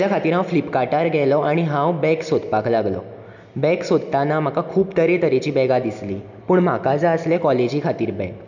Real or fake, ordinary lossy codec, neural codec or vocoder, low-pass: real; none; none; 7.2 kHz